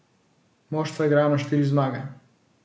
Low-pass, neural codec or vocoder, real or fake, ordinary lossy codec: none; none; real; none